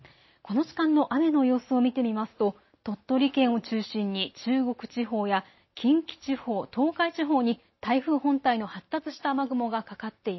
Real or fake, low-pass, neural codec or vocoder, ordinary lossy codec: real; 7.2 kHz; none; MP3, 24 kbps